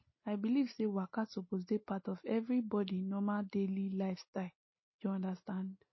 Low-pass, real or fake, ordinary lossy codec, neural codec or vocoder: 7.2 kHz; real; MP3, 24 kbps; none